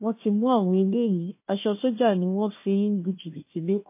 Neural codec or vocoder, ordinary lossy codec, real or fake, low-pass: codec, 16 kHz, 1 kbps, FunCodec, trained on Chinese and English, 50 frames a second; MP3, 24 kbps; fake; 3.6 kHz